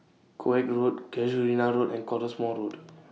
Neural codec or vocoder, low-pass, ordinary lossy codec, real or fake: none; none; none; real